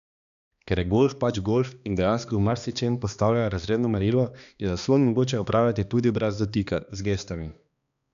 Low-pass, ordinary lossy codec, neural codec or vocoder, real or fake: 7.2 kHz; none; codec, 16 kHz, 2 kbps, X-Codec, HuBERT features, trained on balanced general audio; fake